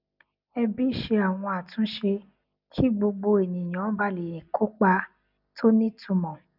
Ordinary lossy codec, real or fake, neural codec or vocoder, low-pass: none; real; none; 5.4 kHz